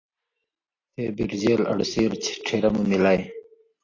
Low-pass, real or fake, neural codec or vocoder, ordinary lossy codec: 7.2 kHz; real; none; AAC, 32 kbps